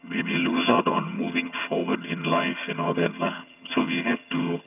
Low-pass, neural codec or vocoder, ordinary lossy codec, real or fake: 3.6 kHz; vocoder, 22.05 kHz, 80 mel bands, HiFi-GAN; none; fake